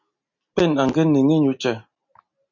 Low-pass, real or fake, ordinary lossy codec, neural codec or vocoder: 7.2 kHz; real; MP3, 48 kbps; none